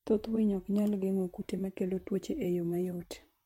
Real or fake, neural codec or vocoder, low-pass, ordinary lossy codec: fake; vocoder, 44.1 kHz, 128 mel bands, Pupu-Vocoder; 19.8 kHz; MP3, 64 kbps